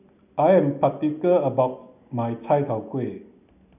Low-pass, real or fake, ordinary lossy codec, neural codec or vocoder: 3.6 kHz; real; none; none